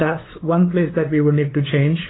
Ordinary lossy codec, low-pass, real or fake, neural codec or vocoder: AAC, 16 kbps; 7.2 kHz; fake; codec, 16 kHz, 2 kbps, FunCodec, trained on LibriTTS, 25 frames a second